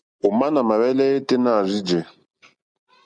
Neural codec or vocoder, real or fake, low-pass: none; real; 9.9 kHz